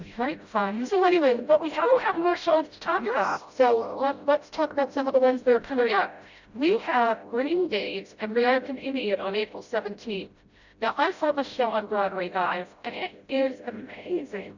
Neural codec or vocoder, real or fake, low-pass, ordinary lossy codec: codec, 16 kHz, 0.5 kbps, FreqCodec, smaller model; fake; 7.2 kHz; Opus, 64 kbps